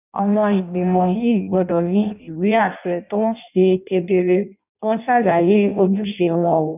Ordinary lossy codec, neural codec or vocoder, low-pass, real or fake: none; codec, 16 kHz in and 24 kHz out, 0.6 kbps, FireRedTTS-2 codec; 3.6 kHz; fake